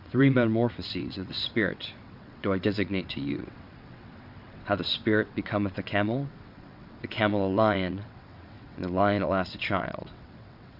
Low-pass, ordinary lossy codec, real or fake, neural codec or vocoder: 5.4 kHz; Opus, 64 kbps; fake; vocoder, 22.05 kHz, 80 mel bands, WaveNeXt